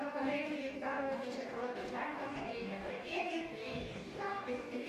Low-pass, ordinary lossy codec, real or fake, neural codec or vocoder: 14.4 kHz; MP3, 64 kbps; fake; codec, 44.1 kHz, 2.6 kbps, DAC